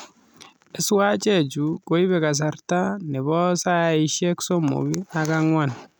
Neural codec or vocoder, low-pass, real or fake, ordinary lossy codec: none; none; real; none